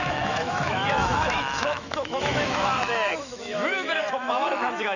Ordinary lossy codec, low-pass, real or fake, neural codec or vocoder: none; 7.2 kHz; fake; autoencoder, 48 kHz, 128 numbers a frame, DAC-VAE, trained on Japanese speech